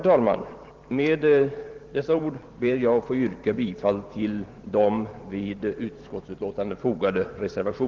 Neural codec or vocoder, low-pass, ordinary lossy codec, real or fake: vocoder, 44.1 kHz, 128 mel bands every 512 samples, BigVGAN v2; 7.2 kHz; Opus, 16 kbps; fake